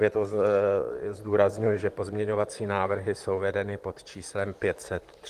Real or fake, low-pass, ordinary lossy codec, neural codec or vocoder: fake; 14.4 kHz; Opus, 24 kbps; vocoder, 44.1 kHz, 128 mel bands, Pupu-Vocoder